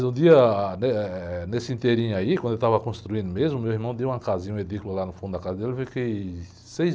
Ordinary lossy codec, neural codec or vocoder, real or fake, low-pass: none; none; real; none